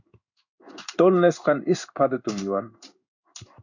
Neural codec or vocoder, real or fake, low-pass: codec, 16 kHz in and 24 kHz out, 1 kbps, XY-Tokenizer; fake; 7.2 kHz